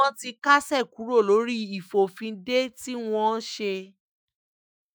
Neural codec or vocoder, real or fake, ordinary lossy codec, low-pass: autoencoder, 48 kHz, 128 numbers a frame, DAC-VAE, trained on Japanese speech; fake; none; none